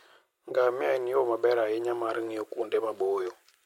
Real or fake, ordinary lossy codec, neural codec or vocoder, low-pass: real; MP3, 64 kbps; none; 19.8 kHz